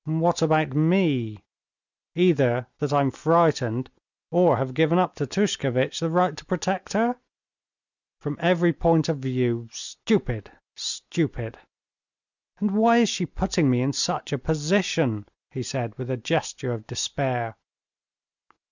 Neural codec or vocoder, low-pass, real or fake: none; 7.2 kHz; real